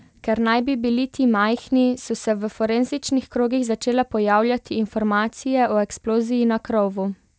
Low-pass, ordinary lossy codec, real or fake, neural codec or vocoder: none; none; real; none